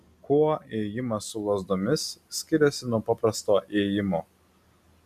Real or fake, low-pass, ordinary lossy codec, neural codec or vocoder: real; 14.4 kHz; MP3, 96 kbps; none